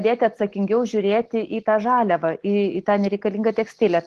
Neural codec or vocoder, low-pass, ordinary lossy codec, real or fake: none; 14.4 kHz; Opus, 16 kbps; real